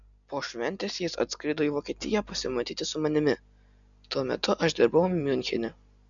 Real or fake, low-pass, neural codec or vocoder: real; 7.2 kHz; none